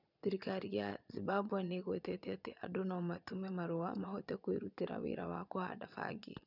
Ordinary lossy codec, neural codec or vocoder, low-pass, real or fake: none; vocoder, 22.05 kHz, 80 mel bands, Vocos; 5.4 kHz; fake